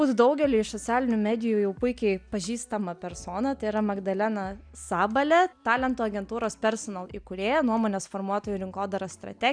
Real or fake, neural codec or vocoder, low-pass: real; none; 9.9 kHz